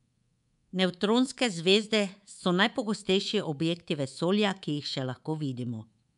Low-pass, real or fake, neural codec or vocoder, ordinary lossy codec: 10.8 kHz; fake; codec, 24 kHz, 3.1 kbps, DualCodec; none